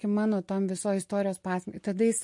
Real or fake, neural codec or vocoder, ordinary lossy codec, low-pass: real; none; MP3, 48 kbps; 10.8 kHz